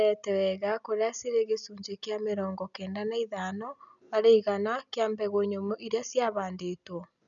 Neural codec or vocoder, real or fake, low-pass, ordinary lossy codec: none; real; 7.2 kHz; none